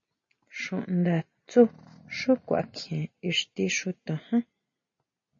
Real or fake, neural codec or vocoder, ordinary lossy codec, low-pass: real; none; MP3, 32 kbps; 7.2 kHz